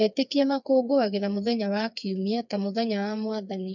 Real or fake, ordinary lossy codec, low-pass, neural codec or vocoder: fake; none; 7.2 kHz; codec, 16 kHz, 4 kbps, FreqCodec, smaller model